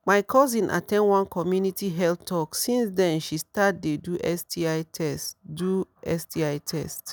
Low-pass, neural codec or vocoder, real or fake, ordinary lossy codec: none; none; real; none